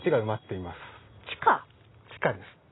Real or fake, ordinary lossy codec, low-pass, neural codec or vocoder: real; AAC, 16 kbps; 7.2 kHz; none